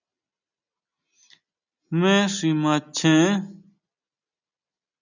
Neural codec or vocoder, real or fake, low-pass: none; real; 7.2 kHz